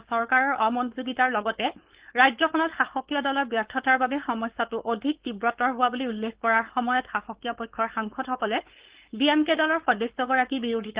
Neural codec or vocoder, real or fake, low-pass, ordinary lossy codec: codec, 16 kHz, 4.8 kbps, FACodec; fake; 3.6 kHz; Opus, 16 kbps